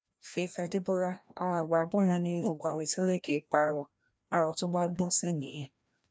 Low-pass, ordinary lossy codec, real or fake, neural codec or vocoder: none; none; fake; codec, 16 kHz, 1 kbps, FreqCodec, larger model